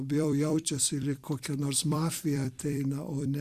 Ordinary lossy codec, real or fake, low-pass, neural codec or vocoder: Opus, 64 kbps; fake; 14.4 kHz; vocoder, 44.1 kHz, 128 mel bands every 256 samples, BigVGAN v2